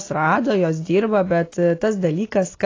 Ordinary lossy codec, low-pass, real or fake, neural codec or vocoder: AAC, 32 kbps; 7.2 kHz; real; none